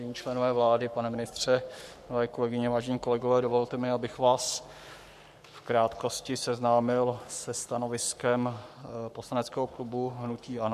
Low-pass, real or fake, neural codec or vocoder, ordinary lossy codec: 14.4 kHz; fake; codec, 44.1 kHz, 7.8 kbps, Pupu-Codec; MP3, 96 kbps